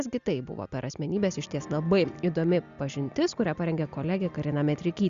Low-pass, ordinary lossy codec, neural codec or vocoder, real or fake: 7.2 kHz; Opus, 64 kbps; none; real